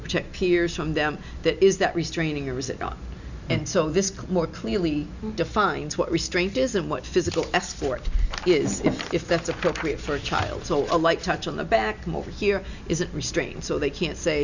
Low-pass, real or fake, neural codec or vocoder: 7.2 kHz; real; none